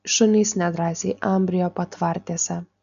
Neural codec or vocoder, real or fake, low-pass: none; real; 7.2 kHz